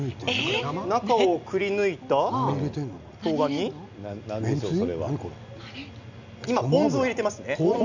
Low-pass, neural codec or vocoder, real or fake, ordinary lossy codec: 7.2 kHz; none; real; none